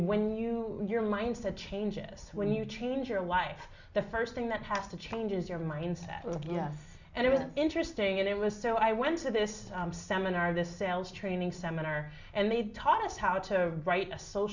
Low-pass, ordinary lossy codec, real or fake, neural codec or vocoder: 7.2 kHz; Opus, 64 kbps; real; none